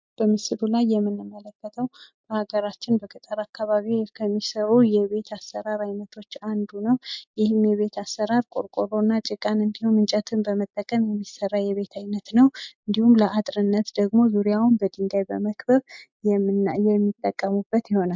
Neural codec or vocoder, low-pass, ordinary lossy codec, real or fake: none; 7.2 kHz; MP3, 64 kbps; real